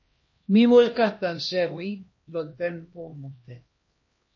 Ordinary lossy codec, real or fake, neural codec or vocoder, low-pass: MP3, 32 kbps; fake; codec, 16 kHz, 1 kbps, X-Codec, HuBERT features, trained on LibriSpeech; 7.2 kHz